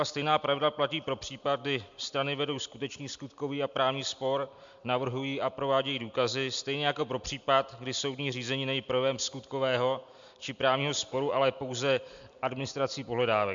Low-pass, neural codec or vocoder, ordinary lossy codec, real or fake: 7.2 kHz; none; MP3, 64 kbps; real